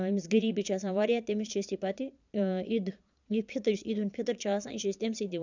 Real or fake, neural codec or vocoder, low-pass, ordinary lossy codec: fake; vocoder, 22.05 kHz, 80 mel bands, Vocos; 7.2 kHz; none